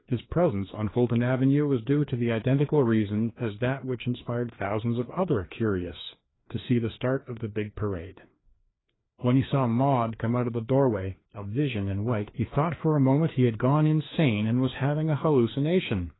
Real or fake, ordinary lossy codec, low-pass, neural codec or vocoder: fake; AAC, 16 kbps; 7.2 kHz; codec, 16 kHz, 2 kbps, FreqCodec, larger model